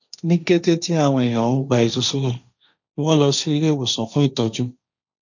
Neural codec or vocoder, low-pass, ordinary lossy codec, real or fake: codec, 16 kHz, 1.1 kbps, Voila-Tokenizer; 7.2 kHz; none; fake